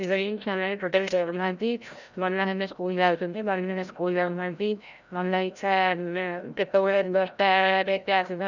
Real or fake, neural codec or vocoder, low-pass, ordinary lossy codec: fake; codec, 16 kHz, 0.5 kbps, FreqCodec, larger model; 7.2 kHz; none